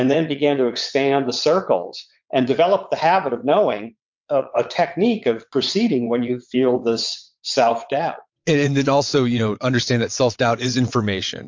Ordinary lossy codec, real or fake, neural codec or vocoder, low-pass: MP3, 48 kbps; fake; vocoder, 22.05 kHz, 80 mel bands, WaveNeXt; 7.2 kHz